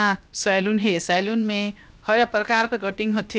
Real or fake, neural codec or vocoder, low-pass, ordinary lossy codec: fake; codec, 16 kHz, 0.7 kbps, FocalCodec; none; none